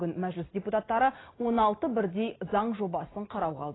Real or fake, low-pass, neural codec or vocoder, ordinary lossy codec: real; 7.2 kHz; none; AAC, 16 kbps